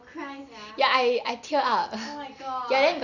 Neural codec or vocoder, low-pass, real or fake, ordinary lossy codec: none; 7.2 kHz; real; none